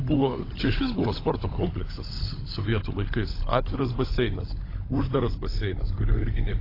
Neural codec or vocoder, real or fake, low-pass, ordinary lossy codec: codec, 16 kHz, 16 kbps, FunCodec, trained on LibriTTS, 50 frames a second; fake; 5.4 kHz; AAC, 24 kbps